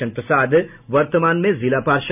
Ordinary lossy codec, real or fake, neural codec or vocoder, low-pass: none; real; none; 3.6 kHz